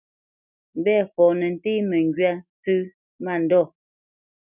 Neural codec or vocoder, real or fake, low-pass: none; real; 3.6 kHz